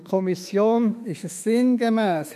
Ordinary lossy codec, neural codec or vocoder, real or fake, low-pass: none; autoencoder, 48 kHz, 32 numbers a frame, DAC-VAE, trained on Japanese speech; fake; 14.4 kHz